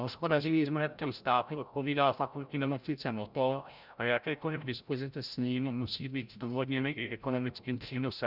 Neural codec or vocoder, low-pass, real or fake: codec, 16 kHz, 0.5 kbps, FreqCodec, larger model; 5.4 kHz; fake